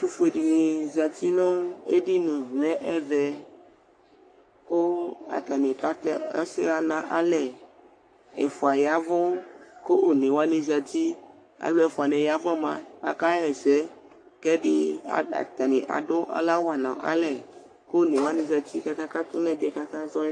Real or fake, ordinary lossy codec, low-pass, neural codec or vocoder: fake; AAC, 48 kbps; 9.9 kHz; codec, 44.1 kHz, 3.4 kbps, Pupu-Codec